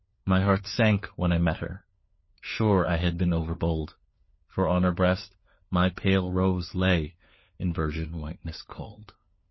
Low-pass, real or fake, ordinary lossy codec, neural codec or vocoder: 7.2 kHz; fake; MP3, 24 kbps; codec, 16 kHz, 4 kbps, FunCodec, trained on LibriTTS, 50 frames a second